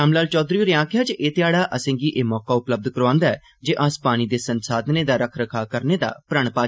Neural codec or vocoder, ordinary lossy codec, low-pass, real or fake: none; none; none; real